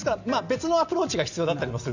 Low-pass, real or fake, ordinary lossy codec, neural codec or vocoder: 7.2 kHz; real; Opus, 64 kbps; none